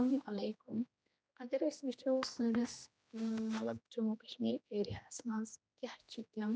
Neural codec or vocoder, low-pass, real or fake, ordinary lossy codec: codec, 16 kHz, 1 kbps, X-Codec, HuBERT features, trained on general audio; none; fake; none